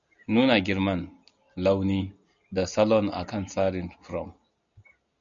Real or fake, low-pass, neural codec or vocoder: real; 7.2 kHz; none